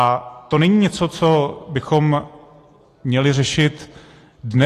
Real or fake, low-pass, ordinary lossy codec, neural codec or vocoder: real; 14.4 kHz; AAC, 48 kbps; none